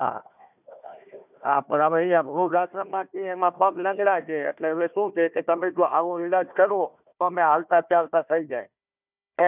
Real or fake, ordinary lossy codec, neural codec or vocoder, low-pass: fake; none; codec, 16 kHz, 1 kbps, FunCodec, trained on Chinese and English, 50 frames a second; 3.6 kHz